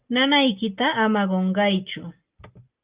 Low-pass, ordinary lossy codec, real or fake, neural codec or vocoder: 3.6 kHz; Opus, 24 kbps; real; none